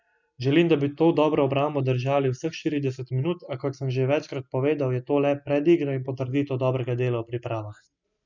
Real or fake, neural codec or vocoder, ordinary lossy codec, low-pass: real; none; none; 7.2 kHz